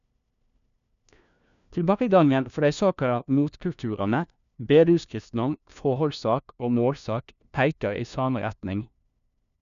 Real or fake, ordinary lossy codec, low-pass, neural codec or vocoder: fake; none; 7.2 kHz; codec, 16 kHz, 1 kbps, FunCodec, trained on LibriTTS, 50 frames a second